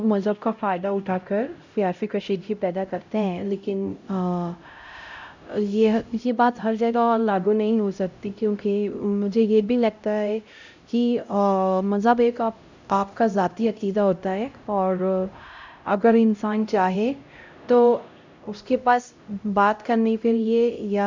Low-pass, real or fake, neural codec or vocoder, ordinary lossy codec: 7.2 kHz; fake; codec, 16 kHz, 0.5 kbps, X-Codec, HuBERT features, trained on LibriSpeech; MP3, 64 kbps